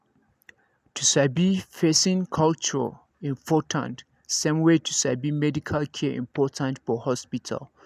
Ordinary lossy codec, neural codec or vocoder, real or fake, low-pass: none; none; real; 14.4 kHz